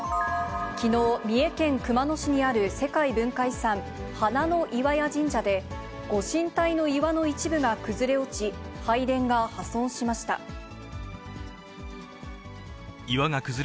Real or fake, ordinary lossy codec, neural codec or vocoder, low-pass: real; none; none; none